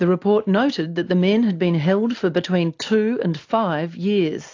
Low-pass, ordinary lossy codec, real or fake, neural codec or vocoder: 7.2 kHz; AAC, 48 kbps; real; none